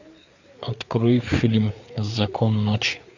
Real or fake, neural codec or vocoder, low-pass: fake; codec, 16 kHz, 4 kbps, FreqCodec, larger model; 7.2 kHz